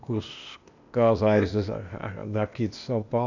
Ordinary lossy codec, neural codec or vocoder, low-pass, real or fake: none; codec, 16 kHz, 0.8 kbps, ZipCodec; 7.2 kHz; fake